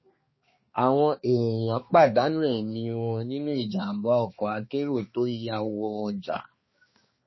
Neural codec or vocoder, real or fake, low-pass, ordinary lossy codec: codec, 16 kHz, 2 kbps, X-Codec, HuBERT features, trained on balanced general audio; fake; 7.2 kHz; MP3, 24 kbps